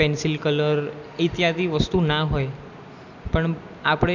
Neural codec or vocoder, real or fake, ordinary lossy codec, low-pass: none; real; none; 7.2 kHz